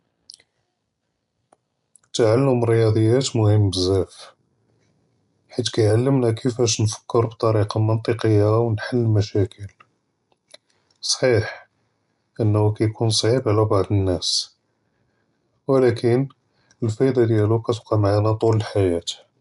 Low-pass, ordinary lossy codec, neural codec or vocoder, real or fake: 10.8 kHz; none; none; real